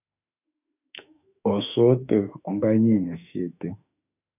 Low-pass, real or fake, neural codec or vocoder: 3.6 kHz; fake; autoencoder, 48 kHz, 32 numbers a frame, DAC-VAE, trained on Japanese speech